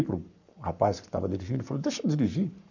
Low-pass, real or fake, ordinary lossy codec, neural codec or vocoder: 7.2 kHz; fake; none; codec, 44.1 kHz, 7.8 kbps, Pupu-Codec